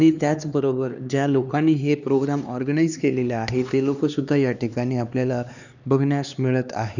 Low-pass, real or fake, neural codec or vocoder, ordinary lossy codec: 7.2 kHz; fake; codec, 16 kHz, 2 kbps, X-Codec, HuBERT features, trained on LibriSpeech; none